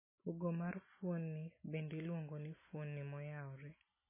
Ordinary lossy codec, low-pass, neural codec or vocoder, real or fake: MP3, 16 kbps; 3.6 kHz; none; real